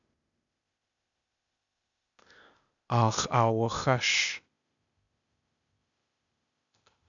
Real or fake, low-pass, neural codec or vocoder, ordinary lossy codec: fake; 7.2 kHz; codec, 16 kHz, 0.8 kbps, ZipCodec; MP3, 96 kbps